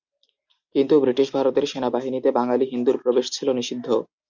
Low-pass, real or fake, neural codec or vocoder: 7.2 kHz; real; none